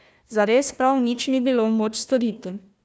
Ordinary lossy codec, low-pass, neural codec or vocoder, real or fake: none; none; codec, 16 kHz, 1 kbps, FunCodec, trained on Chinese and English, 50 frames a second; fake